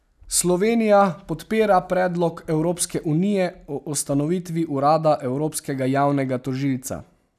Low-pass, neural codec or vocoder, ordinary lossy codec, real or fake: 14.4 kHz; none; none; real